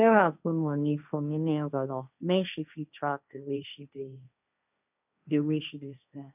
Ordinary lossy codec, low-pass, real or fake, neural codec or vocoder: none; 3.6 kHz; fake; codec, 16 kHz, 1.1 kbps, Voila-Tokenizer